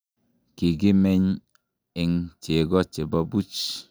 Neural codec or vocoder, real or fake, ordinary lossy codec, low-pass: none; real; none; none